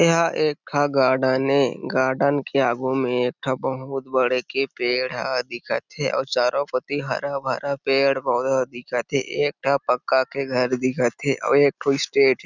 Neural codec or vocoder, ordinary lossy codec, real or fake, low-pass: none; none; real; 7.2 kHz